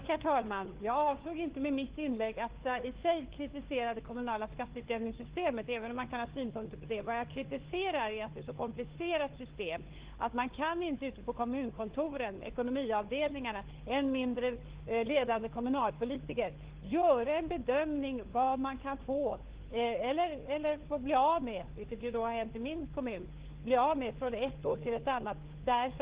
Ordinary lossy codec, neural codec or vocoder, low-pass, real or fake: Opus, 16 kbps; codec, 16 kHz, 4 kbps, FunCodec, trained on LibriTTS, 50 frames a second; 3.6 kHz; fake